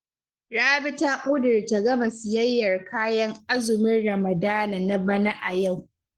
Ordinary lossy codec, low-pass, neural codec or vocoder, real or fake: Opus, 16 kbps; 19.8 kHz; codec, 44.1 kHz, 7.8 kbps, Pupu-Codec; fake